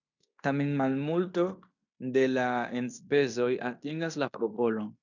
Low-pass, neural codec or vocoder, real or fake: 7.2 kHz; codec, 16 kHz in and 24 kHz out, 0.9 kbps, LongCat-Audio-Codec, fine tuned four codebook decoder; fake